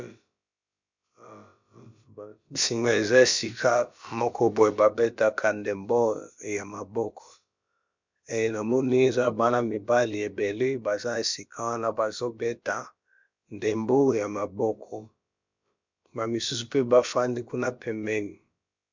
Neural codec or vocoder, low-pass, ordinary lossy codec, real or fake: codec, 16 kHz, about 1 kbps, DyCAST, with the encoder's durations; 7.2 kHz; MP3, 64 kbps; fake